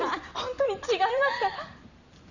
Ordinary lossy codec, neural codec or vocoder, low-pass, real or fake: none; vocoder, 44.1 kHz, 128 mel bands every 256 samples, BigVGAN v2; 7.2 kHz; fake